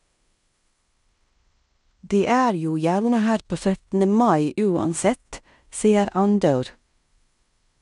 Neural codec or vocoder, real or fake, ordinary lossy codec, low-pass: codec, 16 kHz in and 24 kHz out, 0.9 kbps, LongCat-Audio-Codec, fine tuned four codebook decoder; fake; none; 10.8 kHz